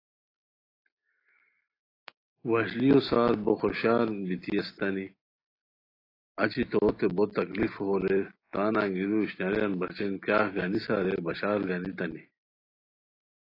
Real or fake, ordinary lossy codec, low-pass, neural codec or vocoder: real; AAC, 24 kbps; 5.4 kHz; none